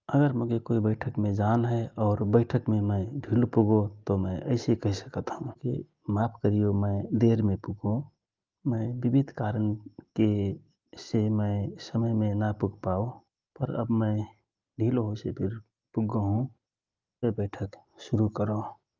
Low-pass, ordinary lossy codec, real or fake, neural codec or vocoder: 7.2 kHz; Opus, 24 kbps; real; none